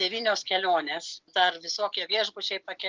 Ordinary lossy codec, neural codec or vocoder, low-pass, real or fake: Opus, 24 kbps; none; 7.2 kHz; real